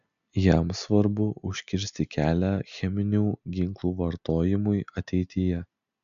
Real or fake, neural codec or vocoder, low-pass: real; none; 7.2 kHz